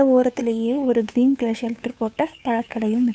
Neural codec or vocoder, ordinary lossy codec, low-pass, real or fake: codec, 16 kHz, 2 kbps, FunCodec, trained on Chinese and English, 25 frames a second; none; none; fake